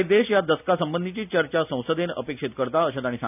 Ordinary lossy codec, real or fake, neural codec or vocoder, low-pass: none; real; none; 3.6 kHz